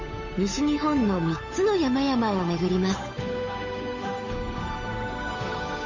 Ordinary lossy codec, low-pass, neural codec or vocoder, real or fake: MP3, 32 kbps; 7.2 kHz; codec, 16 kHz, 8 kbps, FunCodec, trained on Chinese and English, 25 frames a second; fake